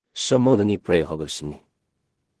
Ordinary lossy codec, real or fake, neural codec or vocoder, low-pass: Opus, 16 kbps; fake; codec, 16 kHz in and 24 kHz out, 0.4 kbps, LongCat-Audio-Codec, two codebook decoder; 10.8 kHz